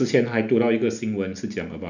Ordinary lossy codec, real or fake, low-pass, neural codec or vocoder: none; real; 7.2 kHz; none